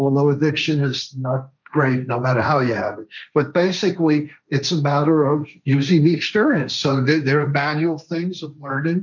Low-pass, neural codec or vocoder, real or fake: 7.2 kHz; codec, 16 kHz, 1.1 kbps, Voila-Tokenizer; fake